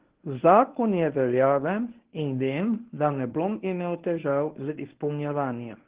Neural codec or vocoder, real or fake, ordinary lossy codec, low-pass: codec, 24 kHz, 0.9 kbps, WavTokenizer, medium speech release version 1; fake; Opus, 16 kbps; 3.6 kHz